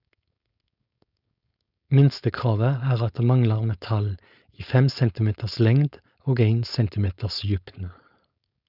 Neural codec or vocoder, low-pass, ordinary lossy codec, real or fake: codec, 16 kHz, 4.8 kbps, FACodec; 5.4 kHz; none; fake